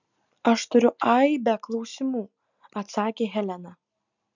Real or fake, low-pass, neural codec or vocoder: real; 7.2 kHz; none